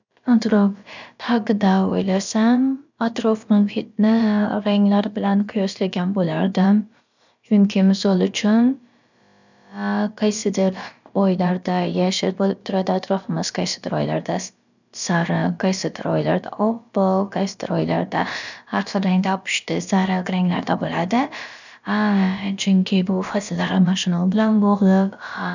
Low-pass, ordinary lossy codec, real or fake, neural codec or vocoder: 7.2 kHz; none; fake; codec, 16 kHz, about 1 kbps, DyCAST, with the encoder's durations